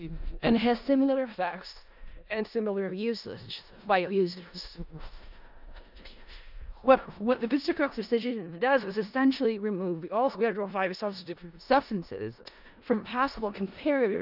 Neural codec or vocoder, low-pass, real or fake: codec, 16 kHz in and 24 kHz out, 0.4 kbps, LongCat-Audio-Codec, four codebook decoder; 5.4 kHz; fake